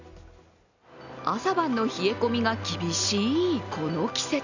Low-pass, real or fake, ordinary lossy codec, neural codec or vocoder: 7.2 kHz; real; none; none